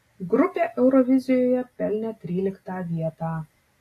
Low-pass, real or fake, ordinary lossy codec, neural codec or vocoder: 14.4 kHz; real; AAC, 48 kbps; none